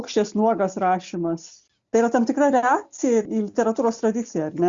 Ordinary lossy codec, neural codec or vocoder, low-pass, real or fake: Opus, 64 kbps; none; 7.2 kHz; real